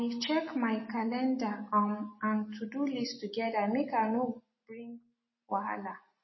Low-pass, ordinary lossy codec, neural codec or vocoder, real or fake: 7.2 kHz; MP3, 24 kbps; none; real